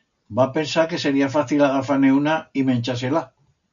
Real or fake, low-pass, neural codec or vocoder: real; 7.2 kHz; none